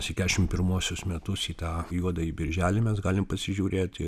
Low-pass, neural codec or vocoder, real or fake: 14.4 kHz; none; real